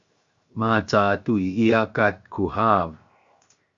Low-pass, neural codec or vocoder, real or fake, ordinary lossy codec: 7.2 kHz; codec, 16 kHz, 0.7 kbps, FocalCodec; fake; Opus, 64 kbps